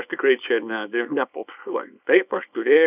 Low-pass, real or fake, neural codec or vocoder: 3.6 kHz; fake; codec, 24 kHz, 0.9 kbps, WavTokenizer, small release